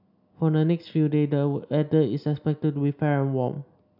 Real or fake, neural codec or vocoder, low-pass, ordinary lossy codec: real; none; 5.4 kHz; none